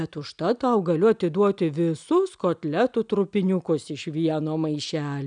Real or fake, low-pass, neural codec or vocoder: real; 9.9 kHz; none